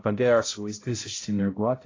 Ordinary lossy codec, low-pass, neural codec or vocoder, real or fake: AAC, 32 kbps; 7.2 kHz; codec, 16 kHz, 0.5 kbps, X-Codec, HuBERT features, trained on balanced general audio; fake